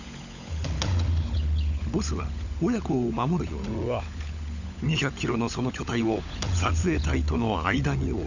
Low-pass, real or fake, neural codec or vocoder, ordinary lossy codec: 7.2 kHz; fake; codec, 16 kHz, 16 kbps, FunCodec, trained on LibriTTS, 50 frames a second; none